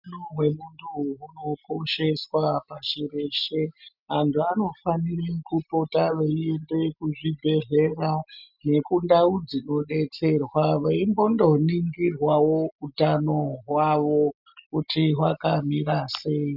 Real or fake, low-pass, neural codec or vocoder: real; 5.4 kHz; none